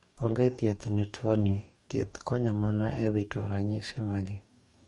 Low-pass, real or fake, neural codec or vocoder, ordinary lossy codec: 19.8 kHz; fake; codec, 44.1 kHz, 2.6 kbps, DAC; MP3, 48 kbps